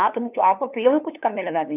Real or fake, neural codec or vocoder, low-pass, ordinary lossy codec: fake; codec, 16 kHz, 2 kbps, FunCodec, trained on LibriTTS, 25 frames a second; 3.6 kHz; none